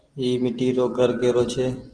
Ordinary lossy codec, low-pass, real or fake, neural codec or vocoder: Opus, 16 kbps; 9.9 kHz; real; none